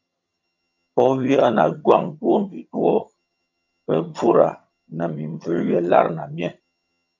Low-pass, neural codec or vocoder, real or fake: 7.2 kHz; vocoder, 22.05 kHz, 80 mel bands, HiFi-GAN; fake